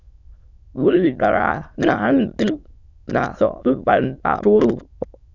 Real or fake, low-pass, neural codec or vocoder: fake; 7.2 kHz; autoencoder, 22.05 kHz, a latent of 192 numbers a frame, VITS, trained on many speakers